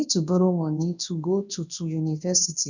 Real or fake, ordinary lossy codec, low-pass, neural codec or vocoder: fake; none; 7.2 kHz; codec, 24 kHz, 0.9 kbps, WavTokenizer, large speech release